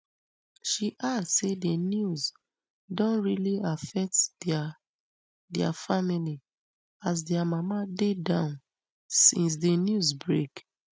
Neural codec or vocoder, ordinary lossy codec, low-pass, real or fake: none; none; none; real